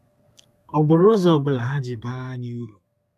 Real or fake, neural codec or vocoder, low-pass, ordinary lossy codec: fake; codec, 32 kHz, 1.9 kbps, SNAC; 14.4 kHz; none